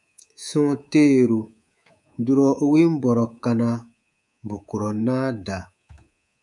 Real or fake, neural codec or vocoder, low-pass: fake; codec, 24 kHz, 3.1 kbps, DualCodec; 10.8 kHz